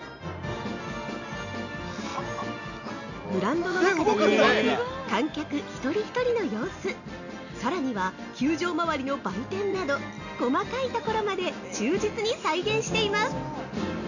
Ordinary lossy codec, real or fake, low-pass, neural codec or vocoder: none; real; 7.2 kHz; none